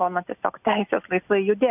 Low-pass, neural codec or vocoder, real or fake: 3.6 kHz; none; real